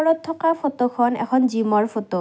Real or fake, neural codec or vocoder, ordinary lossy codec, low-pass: real; none; none; none